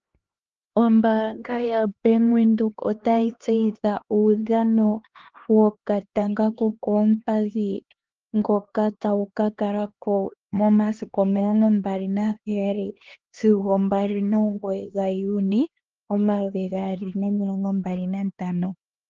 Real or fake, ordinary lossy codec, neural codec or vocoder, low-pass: fake; Opus, 16 kbps; codec, 16 kHz, 2 kbps, X-Codec, HuBERT features, trained on LibriSpeech; 7.2 kHz